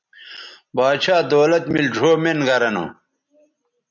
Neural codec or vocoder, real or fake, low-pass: none; real; 7.2 kHz